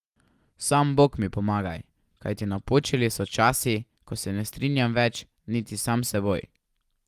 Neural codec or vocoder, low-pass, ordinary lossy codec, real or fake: none; 14.4 kHz; Opus, 32 kbps; real